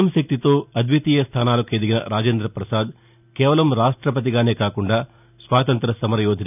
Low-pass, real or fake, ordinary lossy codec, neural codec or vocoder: 3.6 kHz; real; none; none